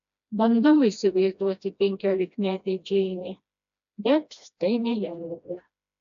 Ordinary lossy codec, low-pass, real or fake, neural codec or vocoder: AAC, 96 kbps; 7.2 kHz; fake; codec, 16 kHz, 1 kbps, FreqCodec, smaller model